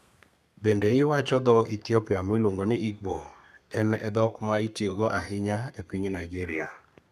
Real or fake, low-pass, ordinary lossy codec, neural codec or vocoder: fake; 14.4 kHz; none; codec, 32 kHz, 1.9 kbps, SNAC